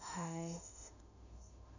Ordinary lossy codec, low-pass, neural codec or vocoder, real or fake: none; 7.2 kHz; autoencoder, 48 kHz, 32 numbers a frame, DAC-VAE, trained on Japanese speech; fake